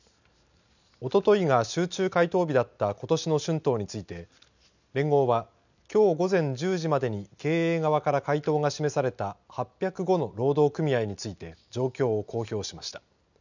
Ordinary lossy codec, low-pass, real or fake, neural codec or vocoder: none; 7.2 kHz; real; none